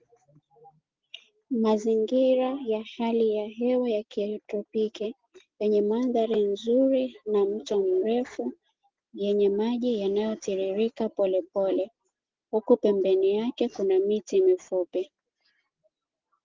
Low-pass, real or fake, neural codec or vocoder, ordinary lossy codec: 7.2 kHz; real; none; Opus, 16 kbps